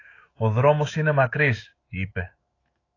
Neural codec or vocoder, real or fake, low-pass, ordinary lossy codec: autoencoder, 48 kHz, 128 numbers a frame, DAC-VAE, trained on Japanese speech; fake; 7.2 kHz; AAC, 32 kbps